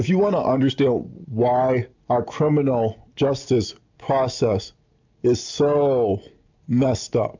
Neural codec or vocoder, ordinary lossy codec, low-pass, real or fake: none; MP3, 64 kbps; 7.2 kHz; real